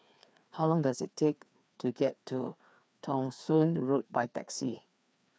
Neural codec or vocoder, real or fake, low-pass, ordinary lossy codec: codec, 16 kHz, 2 kbps, FreqCodec, larger model; fake; none; none